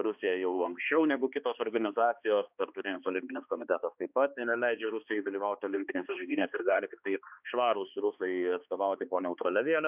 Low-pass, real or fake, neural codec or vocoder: 3.6 kHz; fake; codec, 16 kHz, 2 kbps, X-Codec, HuBERT features, trained on balanced general audio